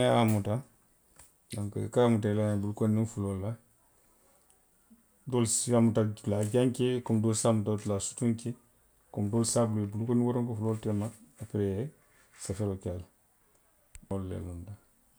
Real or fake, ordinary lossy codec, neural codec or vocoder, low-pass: real; none; none; none